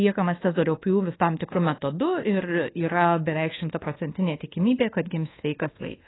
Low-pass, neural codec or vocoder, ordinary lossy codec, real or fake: 7.2 kHz; codec, 24 kHz, 1.2 kbps, DualCodec; AAC, 16 kbps; fake